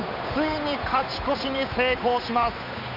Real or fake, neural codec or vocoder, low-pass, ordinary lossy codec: fake; codec, 16 kHz, 8 kbps, FunCodec, trained on Chinese and English, 25 frames a second; 5.4 kHz; none